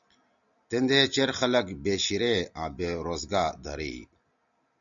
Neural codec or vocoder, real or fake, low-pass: none; real; 7.2 kHz